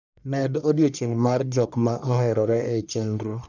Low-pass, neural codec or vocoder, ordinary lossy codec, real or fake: 7.2 kHz; codec, 44.1 kHz, 1.7 kbps, Pupu-Codec; none; fake